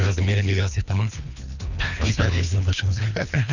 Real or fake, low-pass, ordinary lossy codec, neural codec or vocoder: fake; 7.2 kHz; none; codec, 24 kHz, 3 kbps, HILCodec